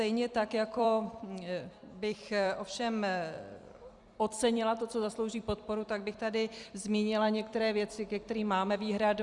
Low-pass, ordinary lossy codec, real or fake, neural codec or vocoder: 10.8 kHz; Opus, 64 kbps; fake; vocoder, 44.1 kHz, 128 mel bands every 256 samples, BigVGAN v2